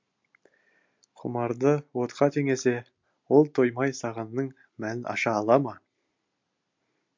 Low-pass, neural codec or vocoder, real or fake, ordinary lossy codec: 7.2 kHz; none; real; MP3, 48 kbps